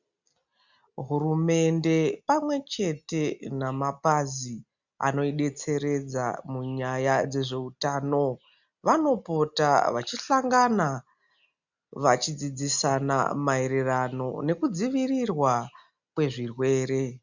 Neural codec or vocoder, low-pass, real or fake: none; 7.2 kHz; real